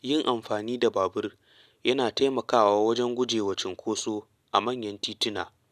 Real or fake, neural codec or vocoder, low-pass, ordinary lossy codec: real; none; 14.4 kHz; none